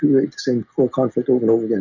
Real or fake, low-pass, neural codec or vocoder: real; 7.2 kHz; none